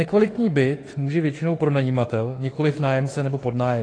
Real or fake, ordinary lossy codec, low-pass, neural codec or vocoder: fake; AAC, 32 kbps; 9.9 kHz; autoencoder, 48 kHz, 32 numbers a frame, DAC-VAE, trained on Japanese speech